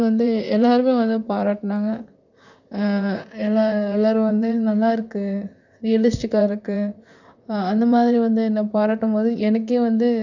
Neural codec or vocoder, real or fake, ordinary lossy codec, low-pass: vocoder, 44.1 kHz, 128 mel bands, Pupu-Vocoder; fake; none; 7.2 kHz